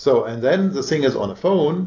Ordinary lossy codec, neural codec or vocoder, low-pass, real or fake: AAC, 48 kbps; none; 7.2 kHz; real